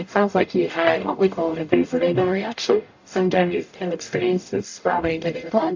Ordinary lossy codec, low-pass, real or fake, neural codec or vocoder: AAC, 48 kbps; 7.2 kHz; fake; codec, 44.1 kHz, 0.9 kbps, DAC